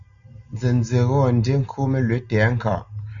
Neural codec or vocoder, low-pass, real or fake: none; 7.2 kHz; real